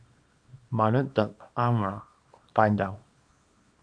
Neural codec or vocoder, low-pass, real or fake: codec, 24 kHz, 0.9 kbps, WavTokenizer, small release; 9.9 kHz; fake